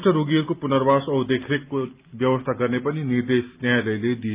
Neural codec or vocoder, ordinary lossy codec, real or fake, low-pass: none; Opus, 24 kbps; real; 3.6 kHz